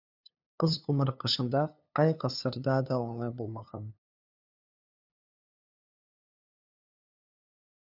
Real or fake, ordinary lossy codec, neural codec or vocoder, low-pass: fake; none; codec, 16 kHz, 2 kbps, FunCodec, trained on LibriTTS, 25 frames a second; 5.4 kHz